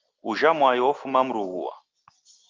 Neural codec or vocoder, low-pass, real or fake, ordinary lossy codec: none; 7.2 kHz; real; Opus, 32 kbps